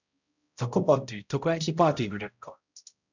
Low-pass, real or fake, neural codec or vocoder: 7.2 kHz; fake; codec, 16 kHz, 0.5 kbps, X-Codec, HuBERT features, trained on balanced general audio